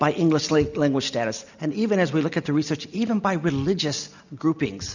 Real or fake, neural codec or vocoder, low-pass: real; none; 7.2 kHz